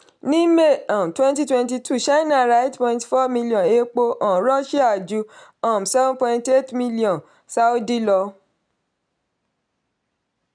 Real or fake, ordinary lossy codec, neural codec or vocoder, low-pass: real; none; none; 9.9 kHz